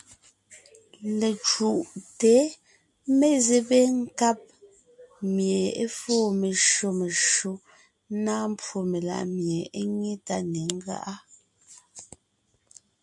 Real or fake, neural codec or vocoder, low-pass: real; none; 10.8 kHz